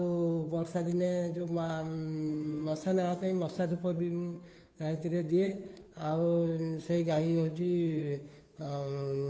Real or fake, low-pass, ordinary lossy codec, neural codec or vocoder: fake; none; none; codec, 16 kHz, 2 kbps, FunCodec, trained on Chinese and English, 25 frames a second